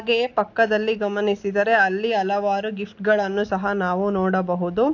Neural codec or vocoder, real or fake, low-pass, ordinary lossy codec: none; real; 7.2 kHz; AAC, 48 kbps